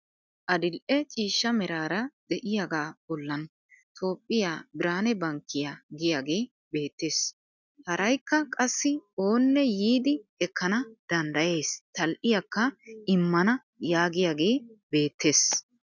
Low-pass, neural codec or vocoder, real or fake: 7.2 kHz; none; real